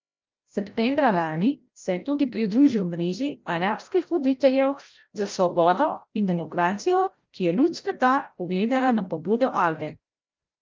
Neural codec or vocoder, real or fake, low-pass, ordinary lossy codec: codec, 16 kHz, 0.5 kbps, FreqCodec, larger model; fake; 7.2 kHz; Opus, 24 kbps